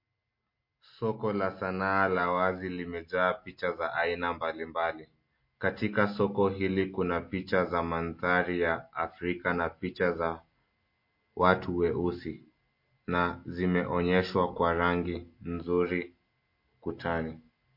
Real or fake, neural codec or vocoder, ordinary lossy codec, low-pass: real; none; MP3, 32 kbps; 5.4 kHz